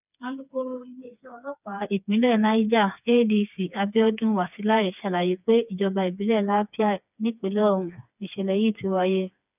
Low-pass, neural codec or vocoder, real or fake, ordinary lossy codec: 3.6 kHz; codec, 16 kHz, 4 kbps, FreqCodec, smaller model; fake; none